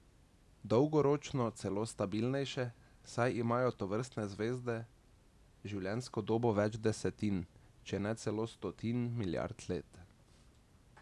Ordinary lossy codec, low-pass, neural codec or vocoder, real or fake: none; none; none; real